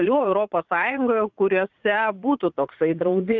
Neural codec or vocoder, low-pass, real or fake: vocoder, 44.1 kHz, 80 mel bands, Vocos; 7.2 kHz; fake